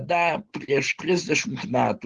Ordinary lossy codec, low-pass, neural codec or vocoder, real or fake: Opus, 16 kbps; 10.8 kHz; none; real